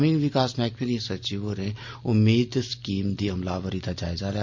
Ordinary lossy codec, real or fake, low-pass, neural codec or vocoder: MP3, 48 kbps; real; 7.2 kHz; none